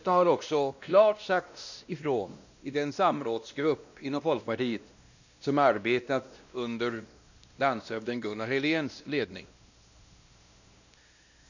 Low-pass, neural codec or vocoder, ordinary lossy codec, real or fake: 7.2 kHz; codec, 16 kHz, 1 kbps, X-Codec, WavLM features, trained on Multilingual LibriSpeech; none; fake